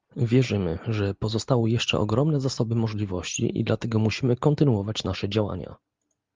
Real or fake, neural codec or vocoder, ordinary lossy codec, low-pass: real; none; Opus, 32 kbps; 7.2 kHz